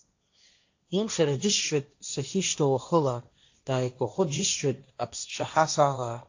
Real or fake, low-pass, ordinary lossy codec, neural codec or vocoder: fake; 7.2 kHz; AAC, 48 kbps; codec, 16 kHz, 1.1 kbps, Voila-Tokenizer